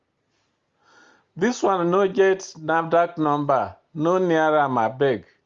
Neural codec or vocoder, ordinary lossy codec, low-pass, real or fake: none; Opus, 32 kbps; 7.2 kHz; real